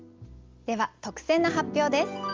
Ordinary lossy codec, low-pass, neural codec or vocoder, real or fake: Opus, 32 kbps; 7.2 kHz; none; real